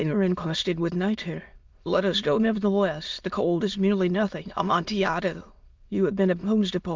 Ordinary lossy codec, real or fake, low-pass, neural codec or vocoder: Opus, 32 kbps; fake; 7.2 kHz; autoencoder, 22.05 kHz, a latent of 192 numbers a frame, VITS, trained on many speakers